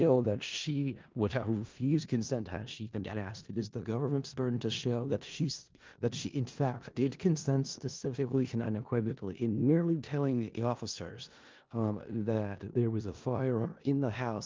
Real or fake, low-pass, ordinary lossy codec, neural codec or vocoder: fake; 7.2 kHz; Opus, 32 kbps; codec, 16 kHz in and 24 kHz out, 0.4 kbps, LongCat-Audio-Codec, four codebook decoder